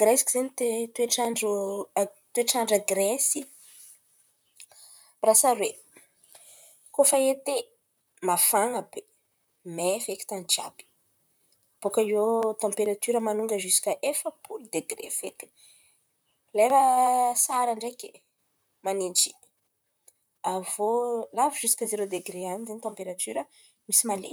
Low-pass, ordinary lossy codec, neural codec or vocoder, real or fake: none; none; vocoder, 44.1 kHz, 128 mel bands, Pupu-Vocoder; fake